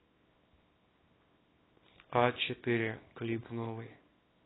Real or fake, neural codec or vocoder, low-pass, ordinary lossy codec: fake; codec, 24 kHz, 0.9 kbps, WavTokenizer, small release; 7.2 kHz; AAC, 16 kbps